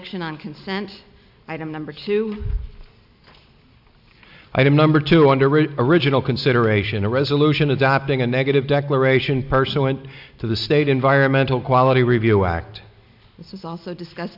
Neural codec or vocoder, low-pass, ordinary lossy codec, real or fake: none; 5.4 kHz; AAC, 48 kbps; real